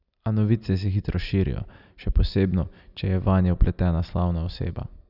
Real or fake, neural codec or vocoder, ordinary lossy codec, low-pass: real; none; none; 5.4 kHz